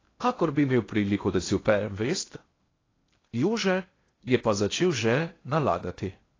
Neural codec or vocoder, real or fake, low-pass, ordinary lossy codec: codec, 16 kHz in and 24 kHz out, 0.8 kbps, FocalCodec, streaming, 65536 codes; fake; 7.2 kHz; AAC, 32 kbps